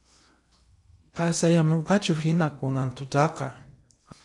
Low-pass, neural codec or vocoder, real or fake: 10.8 kHz; codec, 16 kHz in and 24 kHz out, 0.8 kbps, FocalCodec, streaming, 65536 codes; fake